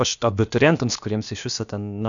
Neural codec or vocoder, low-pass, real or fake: codec, 16 kHz, about 1 kbps, DyCAST, with the encoder's durations; 7.2 kHz; fake